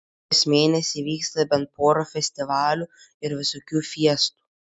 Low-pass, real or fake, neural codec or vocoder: 9.9 kHz; real; none